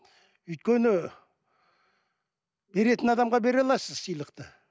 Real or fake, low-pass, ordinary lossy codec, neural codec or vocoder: real; none; none; none